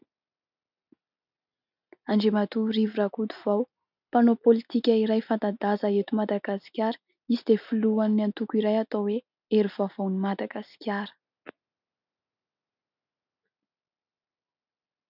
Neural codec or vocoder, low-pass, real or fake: none; 5.4 kHz; real